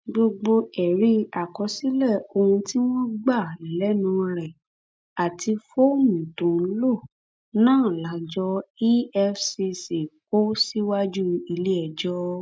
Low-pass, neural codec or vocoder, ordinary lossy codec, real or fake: none; none; none; real